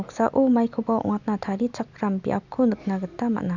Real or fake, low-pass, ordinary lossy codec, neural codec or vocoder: real; 7.2 kHz; none; none